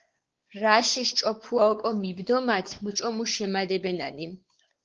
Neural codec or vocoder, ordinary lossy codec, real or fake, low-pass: codec, 16 kHz, 4 kbps, X-Codec, WavLM features, trained on Multilingual LibriSpeech; Opus, 16 kbps; fake; 7.2 kHz